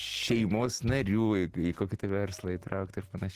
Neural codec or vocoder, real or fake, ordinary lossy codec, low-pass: none; real; Opus, 16 kbps; 14.4 kHz